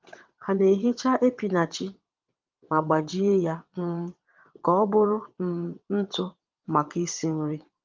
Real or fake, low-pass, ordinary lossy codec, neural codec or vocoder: fake; 7.2 kHz; Opus, 32 kbps; vocoder, 22.05 kHz, 80 mel bands, WaveNeXt